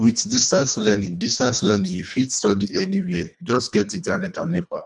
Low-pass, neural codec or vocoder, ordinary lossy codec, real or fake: 10.8 kHz; codec, 24 kHz, 1.5 kbps, HILCodec; none; fake